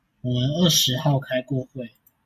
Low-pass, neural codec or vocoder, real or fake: 14.4 kHz; none; real